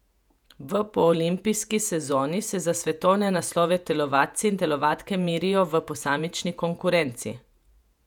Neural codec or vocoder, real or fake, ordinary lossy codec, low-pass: vocoder, 48 kHz, 128 mel bands, Vocos; fake; none; 19.8 kHz